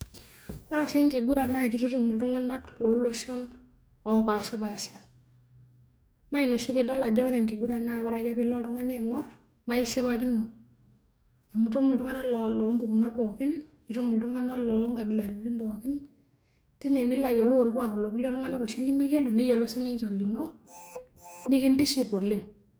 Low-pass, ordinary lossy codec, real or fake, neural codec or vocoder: none; none; fake; codec, 44.1 kHz, 2.6 kbps, DAC